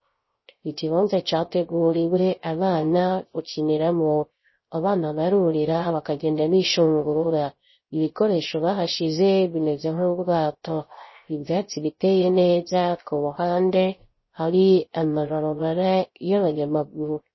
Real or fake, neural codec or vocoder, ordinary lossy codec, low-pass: fake; codec, 16 kHz, 0.3 kbps, FocalCodec; MP3, 24 kbps; 7.2 kHz